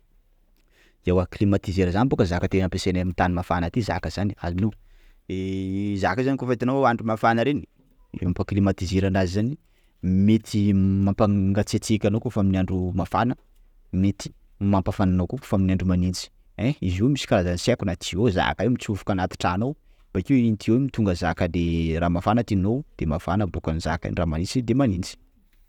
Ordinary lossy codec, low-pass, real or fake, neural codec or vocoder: none; 19.8 kHz; real; none